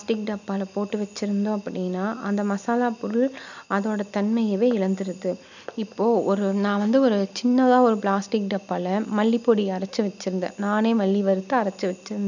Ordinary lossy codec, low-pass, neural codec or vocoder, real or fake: none; 7.2 kHz; none; real